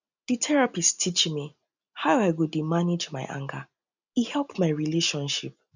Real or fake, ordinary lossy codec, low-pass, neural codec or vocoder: real; none; 7.2 kHz; none